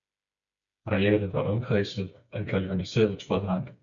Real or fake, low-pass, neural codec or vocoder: fake; 7.2 kHz; codec, 16 kHz, 4 kbps, FreqCodec, smaller model